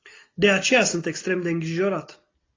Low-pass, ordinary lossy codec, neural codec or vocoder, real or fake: 7.2 kHz; AAC, 32 kbps; none; real